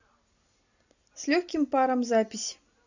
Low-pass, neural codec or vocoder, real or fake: 7.2 kHz; none; real